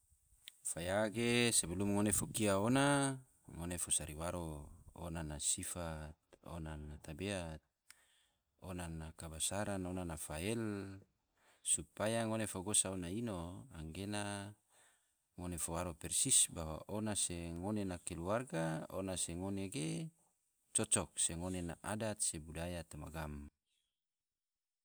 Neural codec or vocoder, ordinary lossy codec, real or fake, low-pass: none; none; real; none